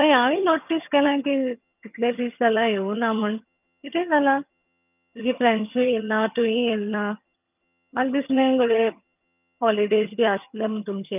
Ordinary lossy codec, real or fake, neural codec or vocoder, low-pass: none; fake; vocoder, 22.05 kHz, 80 mel bands, HiFi-GAN; 3.6 kHz